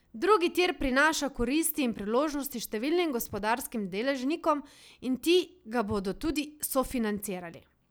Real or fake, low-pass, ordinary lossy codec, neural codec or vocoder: real; none; none; none